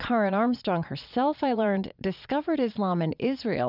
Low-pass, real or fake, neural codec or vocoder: 5.4 kHz; fake; vocoder, 22.05 kHz, 80 mel bands, Vocos